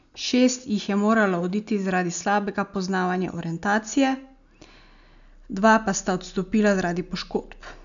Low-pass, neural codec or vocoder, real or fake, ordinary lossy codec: 7.2 kHz; none; real; none